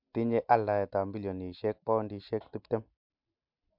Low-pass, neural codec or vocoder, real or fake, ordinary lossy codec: 5.4 kHz; none; real; MP3, 48 kbps